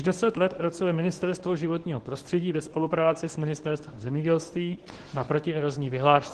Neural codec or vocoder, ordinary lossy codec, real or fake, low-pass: codec, 24 kHz, 0.9 kbps, WavTokenizer, medium speech release version 1; Opus, 16 kbps; fake; 10.8 kHz